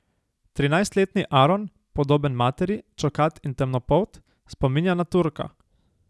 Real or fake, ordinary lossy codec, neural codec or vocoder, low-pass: real; none; none; none